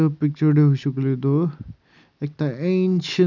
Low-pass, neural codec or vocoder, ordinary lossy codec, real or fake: 7.2 kHz; none; none; real